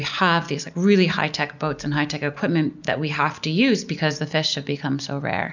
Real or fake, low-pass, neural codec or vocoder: real; 7.2 kHz; none